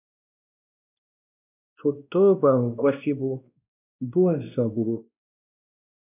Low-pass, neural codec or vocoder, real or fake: 3.6 kHz; codec, 16 kHz, 1 kbps, X-Codec, WavLM features, trained on Multilingual LibriSpeech; fake